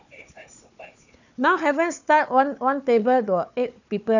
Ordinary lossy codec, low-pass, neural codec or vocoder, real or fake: none; 7.2 kHz; codec, 16 kHz, 4 kbps, FunCodec, trained on Chinese and English, 50 frames a second; fake